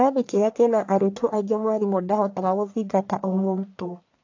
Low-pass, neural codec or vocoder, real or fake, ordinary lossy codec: 7.2 kHz; codec, 44.1 kHz, 1.7 kbps, Pupu-Codec; fake; AAC, 48 kbps